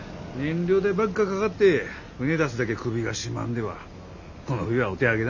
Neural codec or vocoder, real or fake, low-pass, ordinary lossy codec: none; real; 7.2 kHz; none